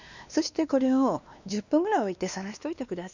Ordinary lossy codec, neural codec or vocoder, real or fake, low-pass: none; codec, 16 kHz, 2 kbps, X-Codec, WavLM features, trained on Multilingual LibriSpeech; fake; 7.2 kHz